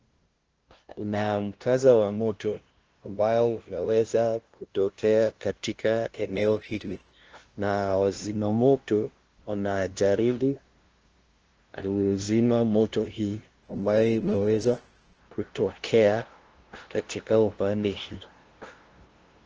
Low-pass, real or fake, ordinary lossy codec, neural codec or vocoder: 7.2 kHz; fake; Opus, 16 kbps; codec, 16 kHz, 0.5 kbps, FunCodec, trained on LibriTTS, 25 frames a second